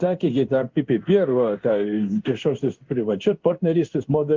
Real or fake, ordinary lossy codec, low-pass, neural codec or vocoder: fake; Opus, 32 kbps; 7.2 kHz; codec, 16 kHz in and 24 kHz out, 1 kbps, XY-Tokenizer